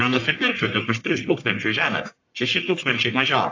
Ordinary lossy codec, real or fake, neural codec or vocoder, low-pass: AAC, 48 kbps; fake; codec, 44.1 kHz, 1.7 kbps, Pupu-Codec; 7.2 kHz